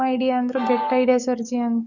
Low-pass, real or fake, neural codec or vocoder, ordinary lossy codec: 7.2 kHz; fake; codec, 44.1 kHz, 7.8 kbps, DAC; none